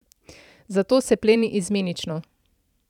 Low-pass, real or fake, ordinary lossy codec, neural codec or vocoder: 19.8 kHz; fake; none; vocoder, 44.1 kHz, 128 mel bands every 512 samples, BigVGAN v2